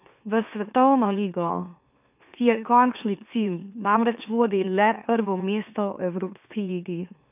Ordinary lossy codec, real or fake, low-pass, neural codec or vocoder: none; fake; 3.6 kHz; autoencoder, 44.1 kHz, a latent of 192 numbers a frame, MeloTTS